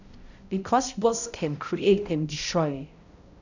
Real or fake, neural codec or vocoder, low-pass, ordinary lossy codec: fake; codec, 16 kHz, 0.5 kbps, X-Codec, HuBERT features, trained on balanced general audio; 7.2 kHz; none